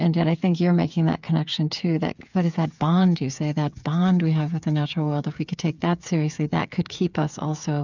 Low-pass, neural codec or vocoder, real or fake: 7.2 kHz; codec, 16 kHz, 8 kbps, FreqCodec, smaller model; fake